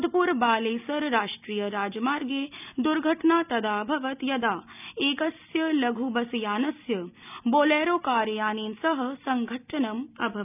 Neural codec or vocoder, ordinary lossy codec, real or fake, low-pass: none; none; real; 3.6 kHz